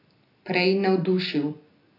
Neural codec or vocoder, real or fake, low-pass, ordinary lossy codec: none; real; 5.4 kHz; AAC, 32 kbps